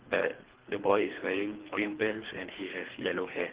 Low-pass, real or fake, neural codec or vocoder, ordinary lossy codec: 3.6 kHz; fake; codec, 24 kHz, 3 kbps, HILCodec; Opus, 16 kbps